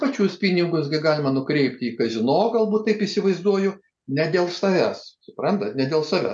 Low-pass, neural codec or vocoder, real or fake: 9.9 kHz; none; real